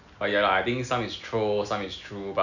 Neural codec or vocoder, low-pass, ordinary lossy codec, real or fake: none; 7.2 kHz; none; real